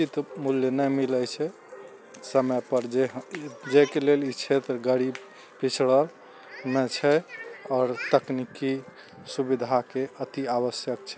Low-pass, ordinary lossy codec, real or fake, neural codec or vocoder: none; none; real; none